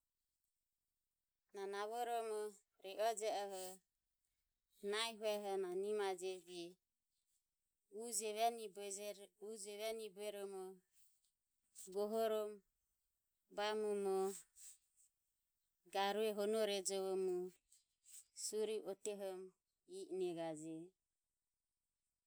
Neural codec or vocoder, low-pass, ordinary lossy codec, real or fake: none; none; none; real